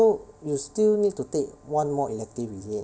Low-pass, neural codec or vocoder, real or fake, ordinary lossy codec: none; none; real; none